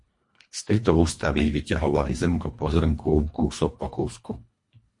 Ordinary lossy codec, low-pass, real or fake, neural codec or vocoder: MP3, 48 kbps; 10.8 kHz; fake; codec, 24 kHz, 1.5 kbps, HILCodec